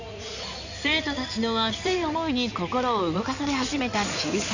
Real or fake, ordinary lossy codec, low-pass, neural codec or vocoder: fake; none; 7.2 kHz; codec, 16 kHz in and 24 kHz out, 2.2 kbps, FireRedTTS-2 codec